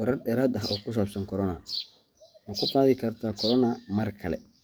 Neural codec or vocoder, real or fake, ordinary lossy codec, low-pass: codec, 44.1 kHz, 7.8 kbps, DAC; fake; none; none